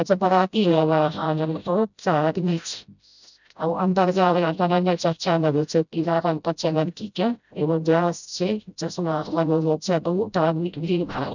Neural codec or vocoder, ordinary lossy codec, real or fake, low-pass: codec, 16 kHz, 0.5 kbps, FreqCodec, smaller model; none; fake; 7.2 kHz